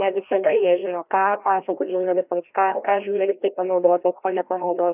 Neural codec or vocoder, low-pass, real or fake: codec, 16 kHz, 1 kbps, FreqCodec, larger model; 3.6 kHz; fake